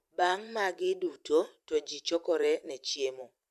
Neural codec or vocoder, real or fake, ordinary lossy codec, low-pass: vocoder, 44.1 kHz, 128 mel bands every 512 samples, BigVGAN v2; fake; MP3, 96 kbps; 14.4 kHz